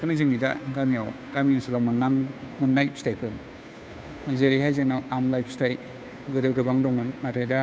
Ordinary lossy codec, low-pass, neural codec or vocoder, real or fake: none; none; codec, 16 kHz, 2 kbps, FunCodec, trained on Chinese and English, 25 frames a second; fake